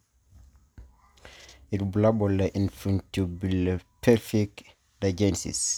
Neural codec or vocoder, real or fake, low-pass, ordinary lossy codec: none; real; none; none